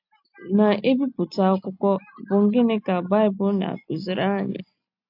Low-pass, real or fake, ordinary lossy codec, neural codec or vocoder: 5.4 kHz; real; MP3, 48 kbps; none